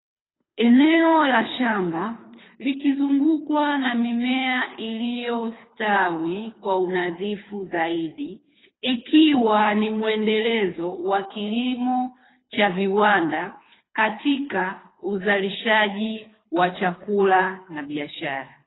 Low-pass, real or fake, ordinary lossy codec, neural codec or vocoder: 7.2 kHz; fake; AAC, 16 kbps; codec, 24 kHz, 3 kbps, HILCodec